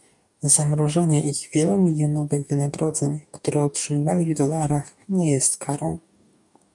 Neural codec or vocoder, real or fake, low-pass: codec, 44.1 kHz, 2.6 kbps, DAC; fake; 10.8 kHz